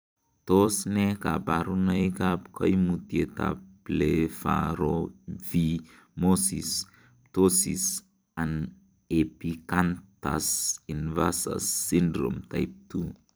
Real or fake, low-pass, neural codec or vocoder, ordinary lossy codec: fake; none; vocoder, 44.1 kHz, 128 mel bands every 256 samples, BigVGAN v2; none